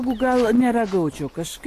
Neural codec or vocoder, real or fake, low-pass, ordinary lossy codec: none; real; 14.4 kHz; AAC, 48 kbps